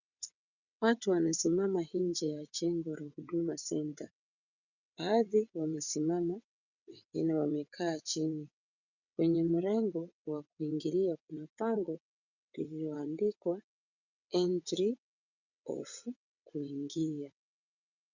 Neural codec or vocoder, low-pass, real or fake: vocoder, 22.05 kHz, 80 mel bands, WaveNeXt; 7.2 kHz; fake